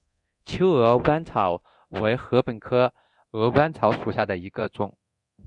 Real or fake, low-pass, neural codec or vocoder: fake; 10.8 kHz; codec, 24 kHz, 0.9 kbps, DualCodec